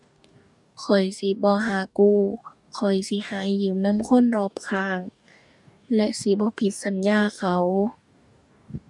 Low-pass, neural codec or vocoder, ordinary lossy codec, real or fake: 10.8 kHz; codec, 44.1 kHz, 2.6 kbps, DAC; none; fake